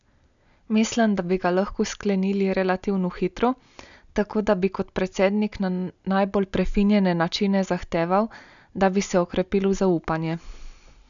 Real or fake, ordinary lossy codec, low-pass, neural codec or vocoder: real; none; 7.2 kHz; none